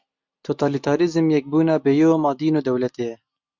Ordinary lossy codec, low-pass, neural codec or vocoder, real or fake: MP3, 64 kbps; 7.2 kHz; none; real